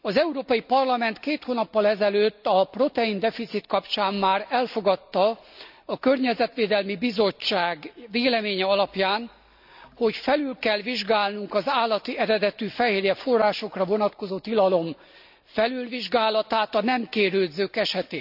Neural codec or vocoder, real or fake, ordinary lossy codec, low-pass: none; real; none; 5.4 kHz